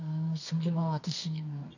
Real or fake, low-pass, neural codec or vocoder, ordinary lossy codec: fake; 7.2 kHz; codec, 24 kHz, 0.9 kbps, WavTokenizer, medium music audio release; none